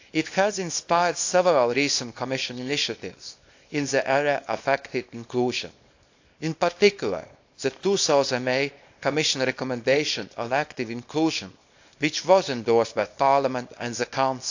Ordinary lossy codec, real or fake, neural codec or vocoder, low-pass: AAC, 48 kbps; fake; codec, 24 kHz, 0.9 kbps, WavTokenizer, small release; 7.2 kHz